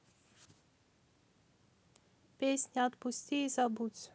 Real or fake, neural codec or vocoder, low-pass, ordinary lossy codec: real; none; none; none